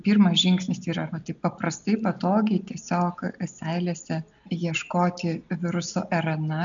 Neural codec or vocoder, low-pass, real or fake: none; 7.2 kHz; real